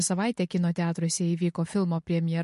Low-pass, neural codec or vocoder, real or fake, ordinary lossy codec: 14.4 kHz; none; real; MP3, 48 kbps